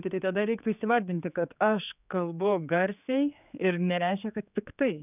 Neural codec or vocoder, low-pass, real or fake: codec, 16 kHz, 4 kbps, X-Codec, HuBERT features, trained on general audio; 3.6 kHz; fake